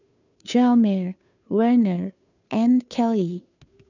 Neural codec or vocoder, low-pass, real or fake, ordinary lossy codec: codec, 16 kHz, 2 kbps, FunCodec, trained on Chinese and English, 25 frames a second; 7.2 kHz; fake; none